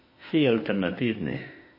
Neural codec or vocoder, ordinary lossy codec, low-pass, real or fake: autoencoder, 48 kHz, 32 numbers a frame, DAC-VAE, trained on Japanese speech; MP3, 24 kbps; 5.4 kHz; fake